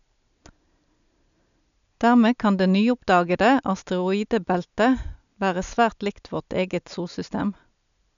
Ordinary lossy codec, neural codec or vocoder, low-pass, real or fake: MP3, 96 kbps; none; 7.2 kHz; real